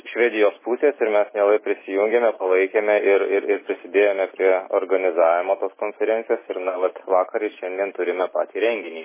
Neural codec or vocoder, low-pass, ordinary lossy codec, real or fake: none; 3.6 kHz; MP3, 16 kbps; real